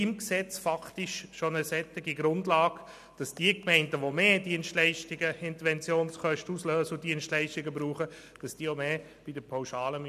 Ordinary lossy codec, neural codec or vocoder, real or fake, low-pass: none; none; real; 14.4 kHz